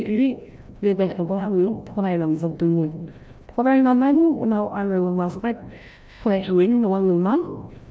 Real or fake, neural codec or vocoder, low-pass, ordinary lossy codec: fake; codec, 16 kHz, 0.5 kbps, FreqCodec, larger model; none; none